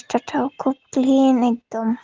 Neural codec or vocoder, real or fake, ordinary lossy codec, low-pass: none; real; Opus, 16 kbps; 7.2 kHz